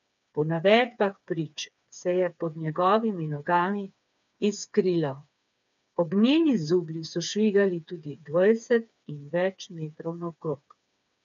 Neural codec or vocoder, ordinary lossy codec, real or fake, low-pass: codec, 16 kHz, 4 kbps, FreqCodec, smaller model; none; fake; 7.2 kHz